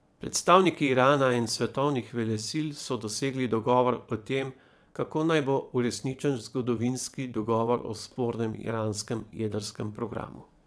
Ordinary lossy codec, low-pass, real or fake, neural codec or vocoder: none; none; fake; vocoder, 22.05 kHz, 80 mel bands, WaveNeXt